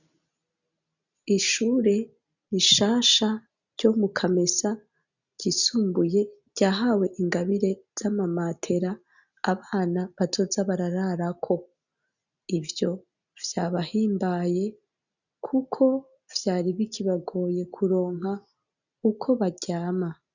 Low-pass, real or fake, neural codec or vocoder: 7.2 kHz; real; none